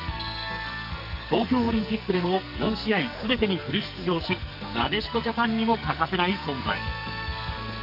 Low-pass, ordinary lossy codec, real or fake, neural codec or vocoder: 5.4 kHz; none; fake; codec, 44.1 kHz, 2.6 kbps, SNAC